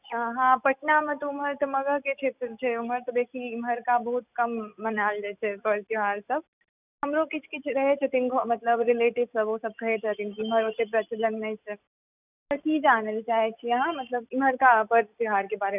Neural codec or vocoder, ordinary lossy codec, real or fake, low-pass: none; none; real; 3.6 kHz